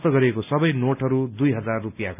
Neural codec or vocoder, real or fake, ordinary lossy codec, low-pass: none; real; none; 3.6 kHz